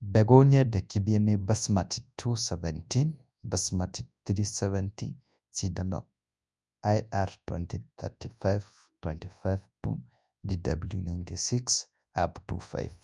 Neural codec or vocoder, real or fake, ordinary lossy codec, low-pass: codec, 24 kHz, 0.9 kbps, WavTokenizer, large speech release; fake; none; 10.8 kHz